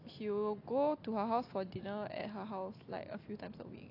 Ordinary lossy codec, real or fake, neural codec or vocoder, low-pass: AAC, 32 kbps; real; none; 5.4 kHz